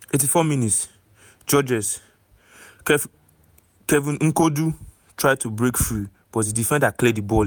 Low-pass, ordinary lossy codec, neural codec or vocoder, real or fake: none; none; vocoder, 48 kHz, 128 mel bands, Vocos; fake